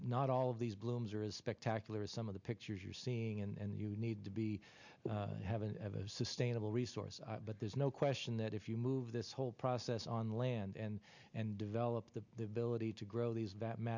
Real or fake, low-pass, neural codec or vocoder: real; 7.2 kHz; none